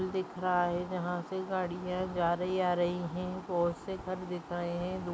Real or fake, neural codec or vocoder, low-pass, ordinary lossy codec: real; none; none; none